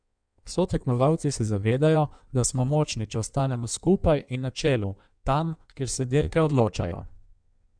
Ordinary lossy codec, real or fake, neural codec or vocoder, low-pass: none; fake; codec, 16 kHz in and 24 kHz out, 1.1 kbps, FireRedTTS-2 codec; 9.9 kHz